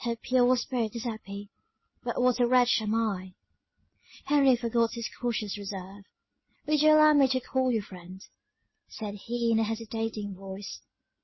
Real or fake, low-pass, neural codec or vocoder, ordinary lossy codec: real; 7.2 kHz; none; MP3, 24 kbps